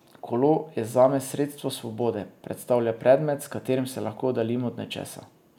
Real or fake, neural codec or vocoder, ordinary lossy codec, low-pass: real; none; none; 19.8 kHz